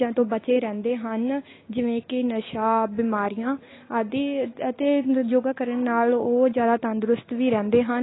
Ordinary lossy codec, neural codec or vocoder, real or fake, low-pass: AAC, 16 kbps; none; real; 7.2 kHz